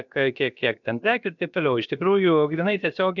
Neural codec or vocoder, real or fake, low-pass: codec, 16 kHz, about 1 kbps, DyCAST, with the encoder's durations; fake; 7.2 kHz